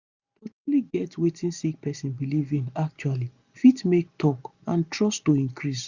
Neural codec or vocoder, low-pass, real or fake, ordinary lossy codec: none; 7.2 kHz; real; Opus, 64 kbps